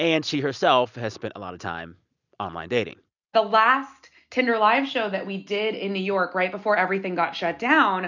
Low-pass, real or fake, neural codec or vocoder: 7.2 kHz; real; none